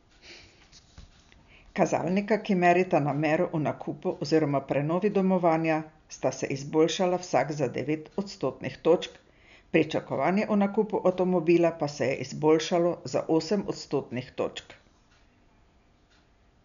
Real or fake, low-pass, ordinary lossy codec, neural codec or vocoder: real; 7.2 kHz; none; none